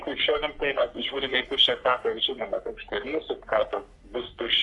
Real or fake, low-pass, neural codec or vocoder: fake; 10.8 kHz; codec, 44.1 kHz, 3.4 kbps, Pupu-Codec